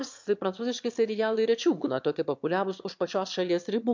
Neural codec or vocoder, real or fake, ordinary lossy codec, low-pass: autoencoder, 22.05 kHz, a latent of 192 numbers a frame, VITS, trained on one speaker; fake; MP3, 64 kbps; 7.2 kHz